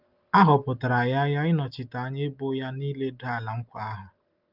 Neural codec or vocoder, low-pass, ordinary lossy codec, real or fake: none; 5.4 kHz; Opus, 24 kbps; real